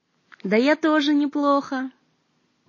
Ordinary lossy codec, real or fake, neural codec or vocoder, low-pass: MP3, 32 kbps; real; none; 7.2 kHz